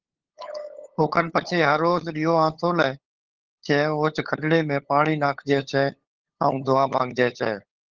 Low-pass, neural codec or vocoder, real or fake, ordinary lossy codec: 7.2 kHz; codec, 16 kHz, 8 kbps, FunCodec, trained on LibriTTS, 25 frames a second; fake; Opus, 16 kbps